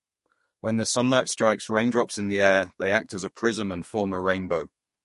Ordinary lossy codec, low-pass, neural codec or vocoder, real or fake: MP3, 48 kbps; 14.4 kHz; codec, 32 kHz, 1.9 kbps, SNAC; fake